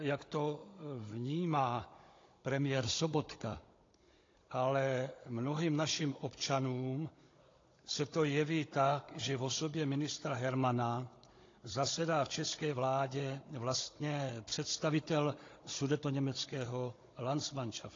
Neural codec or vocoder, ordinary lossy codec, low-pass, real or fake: codec, 16 kHz, 16 kbps, FunCodec, trained on Chinese and English, 50 frames a second; AAC, 32 kbps; 7.2 kHz; fake